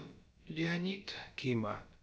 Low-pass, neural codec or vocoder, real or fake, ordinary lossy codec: none; codec, 16 kHz, about 1 kbps, DyCAST, with the encoder's durations; fake; none